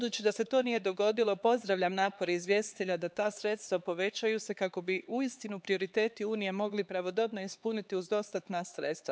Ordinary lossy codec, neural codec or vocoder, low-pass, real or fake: none; codec, 16 kHz, 4 kbps, X-Codec, HuBERT features, trained on LibriSpeech; none; fake